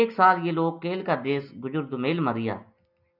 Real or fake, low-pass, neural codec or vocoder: real; 5.4 kHz; none